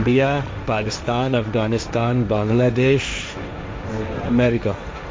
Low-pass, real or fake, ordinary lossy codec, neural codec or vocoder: none; fake; none; codec, 16 kHz, 1.1 kbps, Voila-Tokenizer